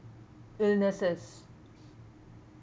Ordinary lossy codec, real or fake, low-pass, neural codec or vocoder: none; real; none; none